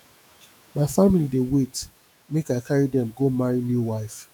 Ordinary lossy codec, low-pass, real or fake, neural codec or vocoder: none; 19.8 kHz; fake; autoencoder, 48 kHz, 128 numbers a frame, DAC-VAE, trained on Japanese speech